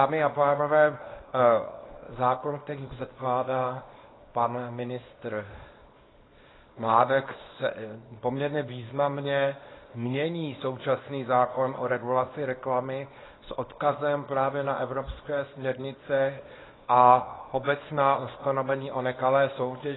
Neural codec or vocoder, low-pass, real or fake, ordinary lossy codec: codec, 24 kHz, 0.9 kbps, WavTokenizer, small release; 7.2 kHz; fake; AAC, 16 kbps